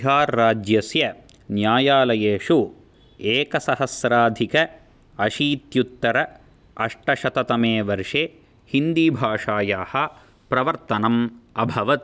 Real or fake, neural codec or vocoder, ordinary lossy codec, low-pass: real; none; none; none